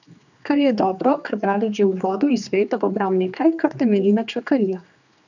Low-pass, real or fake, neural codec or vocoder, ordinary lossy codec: 7.2 kHz; fake; codec, 16 kHz, 2 kbps, X-Codec, HuBERT features, trained on general audio; none